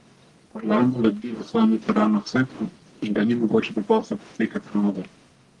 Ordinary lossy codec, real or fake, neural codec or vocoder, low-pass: Opus, 24 kbps; fake; codec, 44.1 kHz, 1.7 kbps, Pupu-Codec; 10.8 kHz